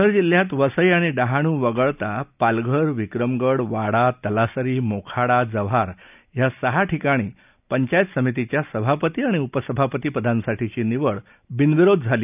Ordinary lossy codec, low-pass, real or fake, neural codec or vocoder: none; 3.6 kHz; real; none